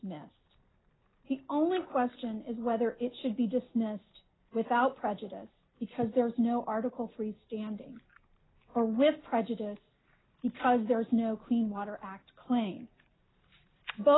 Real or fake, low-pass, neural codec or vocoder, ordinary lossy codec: real; 7.2 kHz; none; AAC, 16 kbps